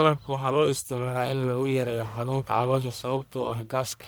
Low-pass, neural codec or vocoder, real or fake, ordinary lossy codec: none; codec, 44.1 kHz, 1.7 kbps, Pupu-Codec; fake; none